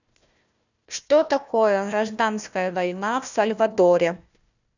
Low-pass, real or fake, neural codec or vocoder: 7.2 kHz; fake; codec, 16 kHz, 1 kbps, FunCodec, trained on Chinese and English, 50 frames a second